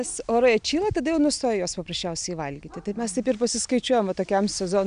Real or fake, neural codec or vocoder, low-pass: real; none; 9.9 kHz